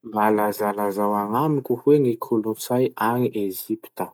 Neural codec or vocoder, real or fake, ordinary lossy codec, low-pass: none; real; none; none